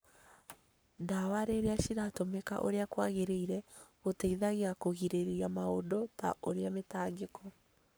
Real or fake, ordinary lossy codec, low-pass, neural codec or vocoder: fake; none; none; codec, 44.1 kHz, 7.8 kbps, Pupu-Codec